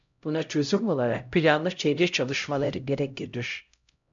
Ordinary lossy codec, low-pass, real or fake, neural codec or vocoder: MP3, 64 kbps; 7.2 kHz; fake; codec, 16 kHz, 0.5 kbps, X-Codec, HuBERT features, trained on LibriSpeech